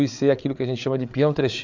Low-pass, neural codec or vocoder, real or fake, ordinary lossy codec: 7.2 kHz; codec, 16 kHz, 8 kbps, FreqCodec, larger model; fake; MP3, 64 kbps